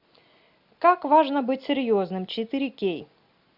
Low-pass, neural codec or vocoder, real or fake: 5.4 kHz; none; real